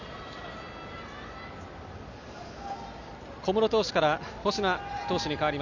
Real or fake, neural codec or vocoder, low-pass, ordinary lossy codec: real; none; 7.2 kHz; none